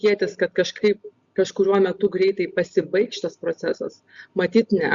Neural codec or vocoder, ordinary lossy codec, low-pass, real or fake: none; Opus, 64 kbps; 7.2 kHz; real